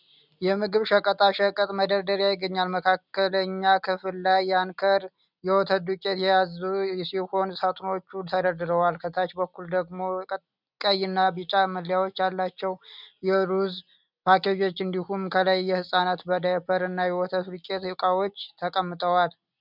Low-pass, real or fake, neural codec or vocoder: 5.4 kHz; real; none